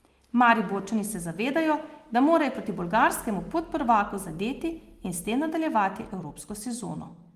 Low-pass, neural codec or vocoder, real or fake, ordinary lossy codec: 14.4 kHz; none; real; Opus, 24 kbps